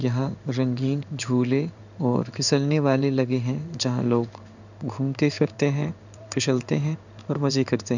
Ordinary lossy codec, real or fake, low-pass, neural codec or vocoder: none; fake; 7.2 kHz; codec, 16 kHz in and 24 kHz out, 1 kbps, XY-Tokenizer